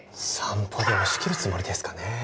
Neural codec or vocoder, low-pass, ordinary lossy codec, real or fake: none; none; none; real